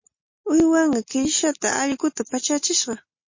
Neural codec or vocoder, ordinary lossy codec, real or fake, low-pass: none; MP3, 32 kbps; real; 7.2 kHz